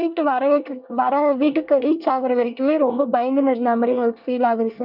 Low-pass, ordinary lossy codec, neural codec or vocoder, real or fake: 5.4 kHz; none; codec, 24 kHz, 1 kbps, SNAC; fake